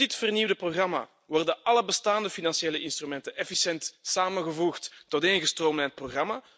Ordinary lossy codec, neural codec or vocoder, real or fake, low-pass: none; none; real; none